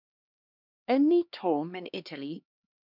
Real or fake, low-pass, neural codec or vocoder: fake; 5.4 kHz; codec, 16 kHz, 2 kbps, X-Codec, HuBERT features, trained on LibriSpeech